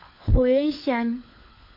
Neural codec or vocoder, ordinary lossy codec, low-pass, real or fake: codec, 16 kHz, 4 kbps, FunCodec, trained on LibriTTS, 50 frames a second; MP3, 32 kbps; 5.4 kHz; fake